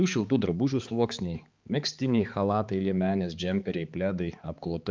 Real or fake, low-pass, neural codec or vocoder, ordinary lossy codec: fake; 7.2 kHz; codec, 16 kHz, 4 kbps, X-Codec, HuBERT features, trained on balanced general audio; Opus, 24 kbps